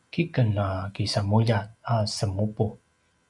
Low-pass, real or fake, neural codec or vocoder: 10.8 kHz; real; none